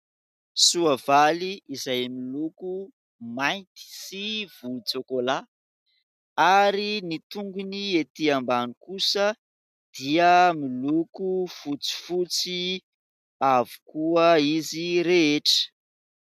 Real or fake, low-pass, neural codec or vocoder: real; 14.4 kHz; none